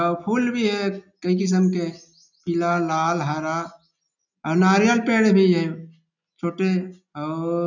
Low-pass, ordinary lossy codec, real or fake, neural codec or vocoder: 7.2 kHz; none; real; none